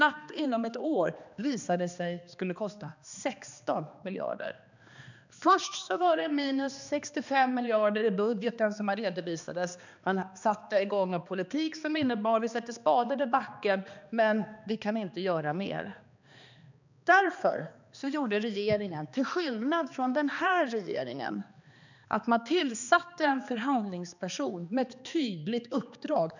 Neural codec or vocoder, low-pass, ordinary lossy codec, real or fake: codec, 16 kHz, 2 kbps, X-Codec, HuBERT features, trained on balanced general audio; 7.2 kHz; none; fake